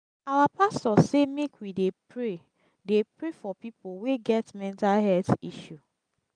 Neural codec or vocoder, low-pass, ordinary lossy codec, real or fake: none; 9.9 kHz; none; real